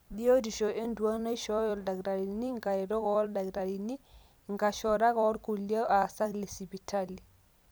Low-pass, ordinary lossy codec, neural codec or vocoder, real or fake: none; none; vocoder, 44.1 kHz, 128 mel bands every 256 samples, BigVGAN v2; fake